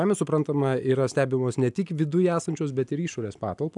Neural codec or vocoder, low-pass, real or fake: none; 10.8 kHz; real